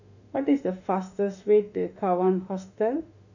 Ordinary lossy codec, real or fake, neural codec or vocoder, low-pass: none; fake; autoencoder, 48 kHz, 32 numbers a frame, DAC-VAE, trained on Japanese speech; 7.2 kHz